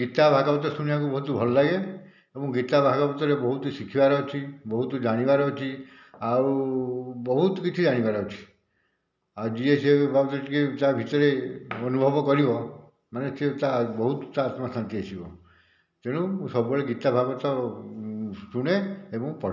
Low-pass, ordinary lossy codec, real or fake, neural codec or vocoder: 7.2 kHz; none; real; none